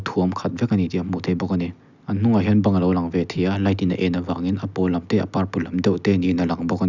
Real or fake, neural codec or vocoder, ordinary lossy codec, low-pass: real; none; none; 7.2 kHz